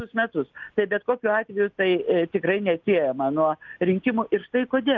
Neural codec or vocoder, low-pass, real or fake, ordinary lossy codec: none; 7.2 kHz; real; Opus, 24 kbps